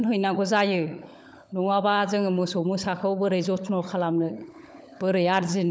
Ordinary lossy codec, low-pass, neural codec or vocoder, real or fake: none; none; codec, 16 kHz, 16 kbps, FunCodec, trained on LibriTTS, 50 frames a second; fake